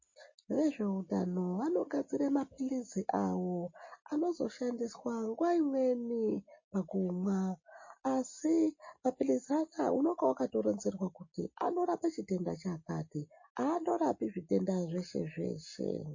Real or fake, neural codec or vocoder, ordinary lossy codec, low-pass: real; none; MP3, 32 kbps; 7.2 kHz